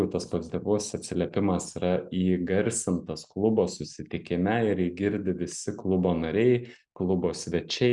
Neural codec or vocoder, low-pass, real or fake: none; 10.8 kHz; real